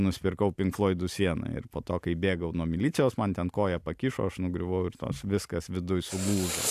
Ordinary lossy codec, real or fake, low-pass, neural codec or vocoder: AAC, 96 kbps; real; 14.4 kHz; none